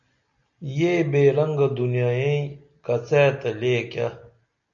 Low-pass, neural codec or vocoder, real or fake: 7.2 kHz; none; real